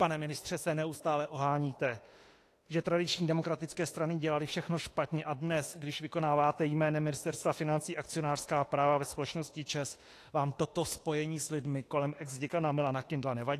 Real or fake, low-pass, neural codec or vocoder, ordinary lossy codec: fake; 14.4 kHz; autoencoder, 48 kHz, 32 numbers a frame, DAC-VAE, trained on Japanese speech; AAC, 48 kbps